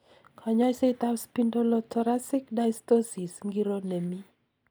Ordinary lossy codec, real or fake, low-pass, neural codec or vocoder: none; real; none; none